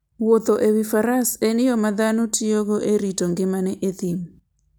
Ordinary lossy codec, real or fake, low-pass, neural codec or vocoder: none; real; none; none